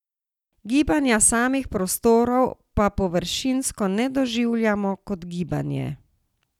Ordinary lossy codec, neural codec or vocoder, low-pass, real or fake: none; none; 19.8 kHz; real